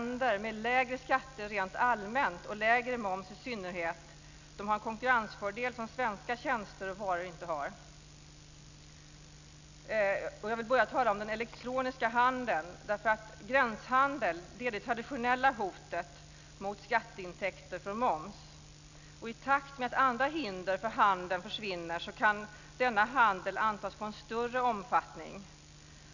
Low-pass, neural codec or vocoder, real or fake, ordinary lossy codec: 7.2 kHz; none; real; none